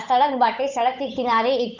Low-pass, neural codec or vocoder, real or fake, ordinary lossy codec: 7.2 kHz; codec, 16 kHz, 8 kbps, FunCodec, trained on Chinese and English, 25 frames a second; fake; none